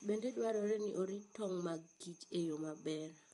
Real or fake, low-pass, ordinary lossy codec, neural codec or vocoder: fake; 19.8 kHz; MP3, 48 kbps; vocoder, 44.1 kHz, 128 mel bands every 512 samples, BigVGAN v2